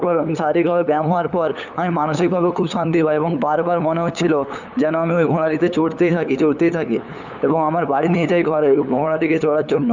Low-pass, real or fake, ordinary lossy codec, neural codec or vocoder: 7.2 kHz; fake; none; codec, 16 kHz, 8 kbps, FunCodec, trained on LibriTTS, 25 frames a second